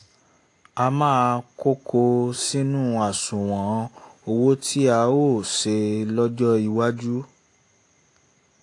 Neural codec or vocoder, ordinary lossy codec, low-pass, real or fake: none; AAC, 48 kbps; 10.8 kHz; real